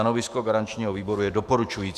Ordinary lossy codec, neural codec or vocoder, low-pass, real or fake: Opus, 64 kbps; autoencoder, 48 kHz, 128 numbers a frame, DAC-VAE, trained on Japanese speech; 14.4 kHz; fake